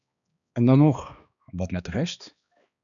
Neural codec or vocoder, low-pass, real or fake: codec, 16 kHz, 2 kbps, X-Codec, HuBERT features, trained on balanced general audio; 7.2 kHz; fake